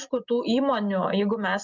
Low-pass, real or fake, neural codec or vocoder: 7.2 kHz; real; none